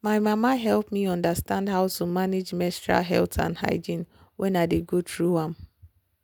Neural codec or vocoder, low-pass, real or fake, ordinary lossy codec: none; none; real; none